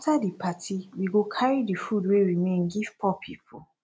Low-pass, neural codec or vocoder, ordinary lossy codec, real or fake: none; none; none; real